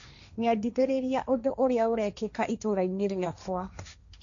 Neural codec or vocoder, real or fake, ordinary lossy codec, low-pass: codec, 16 kHz, 1.1 kbps, Voila-Tokenizer; fake; none; 7.2 kHz